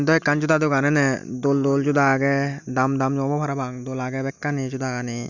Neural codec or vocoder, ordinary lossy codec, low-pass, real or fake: none; none; 7.2 kHz; real